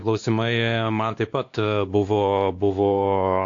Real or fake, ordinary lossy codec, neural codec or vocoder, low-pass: fake; AAC, 48 kbps; codec, 16 kHz, 2 kbps, X-Codec, WavLM features, trained on Multilingual LibriSpeech; 7.2 kHz